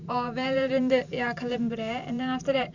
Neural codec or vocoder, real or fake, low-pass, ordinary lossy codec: vocoder, 44.1 kHz, 128 mel bands every 512 samples, BigVGAN v2; fake; 7.2 kHz; none